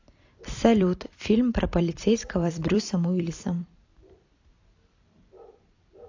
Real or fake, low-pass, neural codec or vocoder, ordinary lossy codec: fake; 7.2 kHz; vocoder, 44.1 kHz, 128 mel bands every 256 samples, BigVGAN v2; AAC, 48 kbps